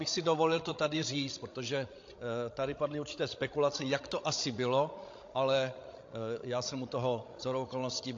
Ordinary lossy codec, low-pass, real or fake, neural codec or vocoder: AAC, 48 kbps; 7.2 kHz; fake; codec, 16 kHz, 16 kbps, FreqCodec, larger model